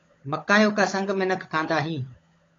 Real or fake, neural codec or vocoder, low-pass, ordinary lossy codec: fake; codec, 16 kHz, 16 kbps, FunCodec, trained on LibriTTS, 50 frames a second; 7.2 kHz; AAC, 32 kbps